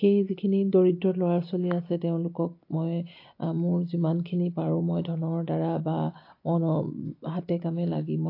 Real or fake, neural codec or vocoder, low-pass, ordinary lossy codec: real; none; 5.4 kHz; AAC, 32 kbps